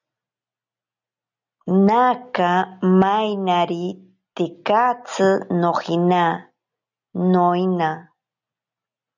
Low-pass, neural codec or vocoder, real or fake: 7.2 kHz; none; real